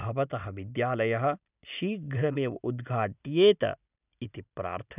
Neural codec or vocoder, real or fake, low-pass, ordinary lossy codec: none; real; 3.6 kHz; none